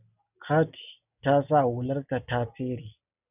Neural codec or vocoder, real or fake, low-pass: none; real; 3.6 kHz